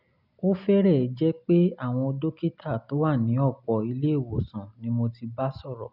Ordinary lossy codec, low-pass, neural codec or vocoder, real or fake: none; 5.4 kHz; none; real